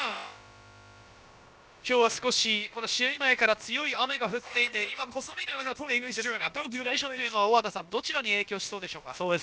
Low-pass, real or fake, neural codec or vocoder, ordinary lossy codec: none; fake; codec, 16 kHz, about 1 kbps, DyCAST, with the encoder's durations; none